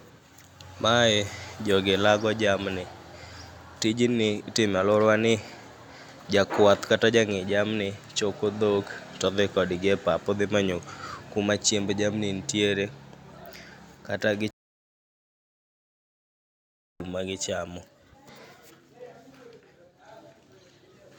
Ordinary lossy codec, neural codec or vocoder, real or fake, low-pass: none; none; real; 19.8 kHz